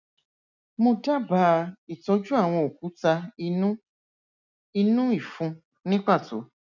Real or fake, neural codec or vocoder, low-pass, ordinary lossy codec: real; none; 7.2 kHz; none